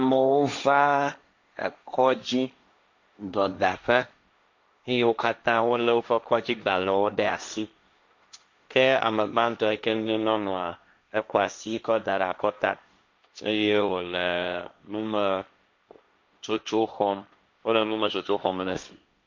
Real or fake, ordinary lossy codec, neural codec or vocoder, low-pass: fake; MP3, 64 kbps; codec, 16 kHz, 1.1 kbps, Voila-Tokenizer; 7.2 kHz